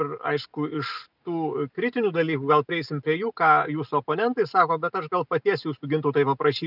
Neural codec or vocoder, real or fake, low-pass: none; real; 5.4 kHz